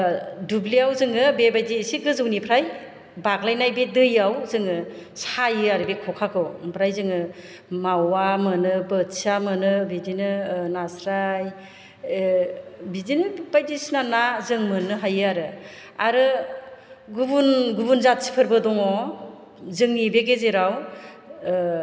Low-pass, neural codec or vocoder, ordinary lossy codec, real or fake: none; none; none; real